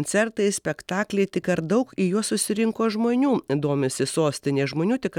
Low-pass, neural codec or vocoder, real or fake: 19.8 kHz; none; real